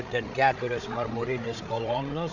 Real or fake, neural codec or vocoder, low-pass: fake; codec, 16 kHz, 8 kbps, FreqCodec, larger model; 7.2 kHz